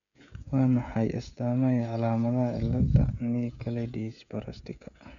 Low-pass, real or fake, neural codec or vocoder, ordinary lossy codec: 7.2 kHz; fake; codec, 16 kHz, 16 kbps, FreqCodec, smaller model; none